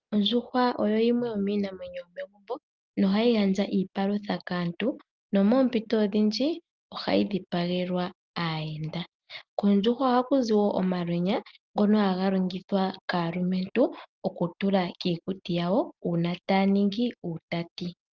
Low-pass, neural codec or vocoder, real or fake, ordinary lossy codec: 7.2 kHz; none; real; Opus, 32 kbps